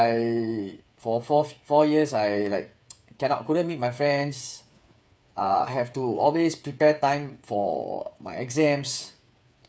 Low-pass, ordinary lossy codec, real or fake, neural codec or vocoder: none; none; fake; codec, 16 kHz, 8 kbps, FreqCodec, smaller model